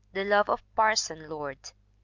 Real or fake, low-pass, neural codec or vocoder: real; 7.2 kHz; none